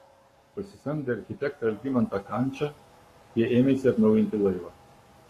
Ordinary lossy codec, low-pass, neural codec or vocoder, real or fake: AAC, 48 kbps; 14.4 kHz; codec, 44.1 kHz, 7.8 kbps, Pupu-Codec; fake